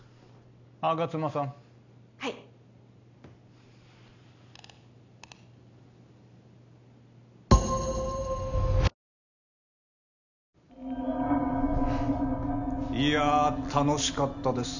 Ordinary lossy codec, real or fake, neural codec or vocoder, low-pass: none; real; none; 7.2 kHz